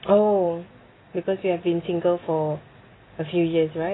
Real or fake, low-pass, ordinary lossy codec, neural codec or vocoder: real; 7.2 kHz; AAC, 16 kbps; none